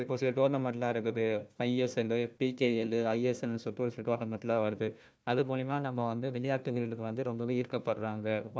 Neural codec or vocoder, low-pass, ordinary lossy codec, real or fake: codec, 16 kHz, 1 kbps, FunCodec, trained on Chinese and English, 50 frames a second; none; none; fake